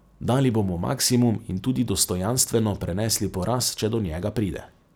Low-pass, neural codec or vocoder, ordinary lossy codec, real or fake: none; none; none; real